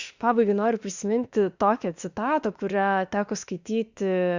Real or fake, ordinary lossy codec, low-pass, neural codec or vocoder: fake; Opus, 64 kbps; 7.2 kHz; autoencoder, 48 kHz, 32 numbers a frame, DAC-VAE, trained on Japanese speech